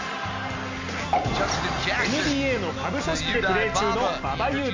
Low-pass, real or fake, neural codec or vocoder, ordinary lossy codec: 7.2 kHz; real; none; MP3, 48 kbps